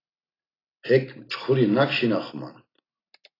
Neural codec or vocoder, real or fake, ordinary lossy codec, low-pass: none; real; AAC, 24 kbps; 5.4 kHz